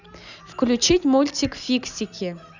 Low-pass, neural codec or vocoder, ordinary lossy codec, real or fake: 7.2 kHz; none; none; real